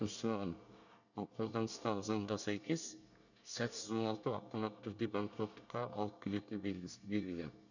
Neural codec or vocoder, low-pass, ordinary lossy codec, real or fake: codec, 24 kHz, 1 kbps, SNAC; 7.2 kHz; none; fake